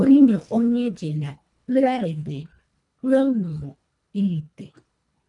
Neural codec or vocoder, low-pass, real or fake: codec, 24 kHz, 1.5 kbps, HILCodec; 10.8 kHz; fake